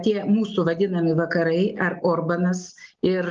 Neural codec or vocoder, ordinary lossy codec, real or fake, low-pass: none; Opus, 32 kbps; real; 7.2 kHz